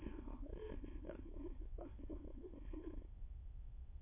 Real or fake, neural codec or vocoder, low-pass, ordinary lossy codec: fake; autoencoder, 22.05 kHz, a latent of 192 numbers a frame, VITS, trained on many speakers; 3.6 kHz; MP3, 24 kbps